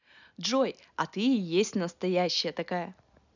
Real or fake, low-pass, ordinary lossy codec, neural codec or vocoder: real; 7.2 kHz; none; none